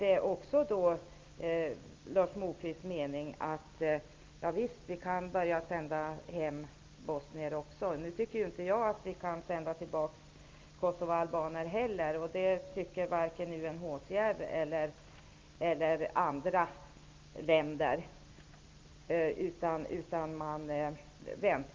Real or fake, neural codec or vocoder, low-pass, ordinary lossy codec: real; none; 7.2 kHz; Opus, 32 kbps